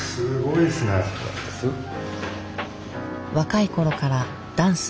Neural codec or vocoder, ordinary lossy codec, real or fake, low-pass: none; none; real; none